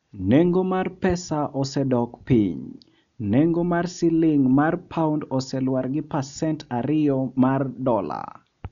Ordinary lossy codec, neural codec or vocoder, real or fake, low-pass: none; none; real; 7.2 kHz